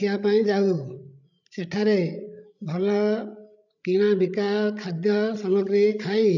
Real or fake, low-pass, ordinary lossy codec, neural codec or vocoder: fake; 7.2 kHz; none; codec, 16 kHz, 8 kbps, FreqCodec, larger model